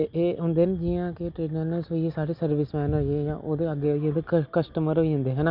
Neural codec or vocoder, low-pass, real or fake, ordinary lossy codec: none; 5.4 kHz; real; none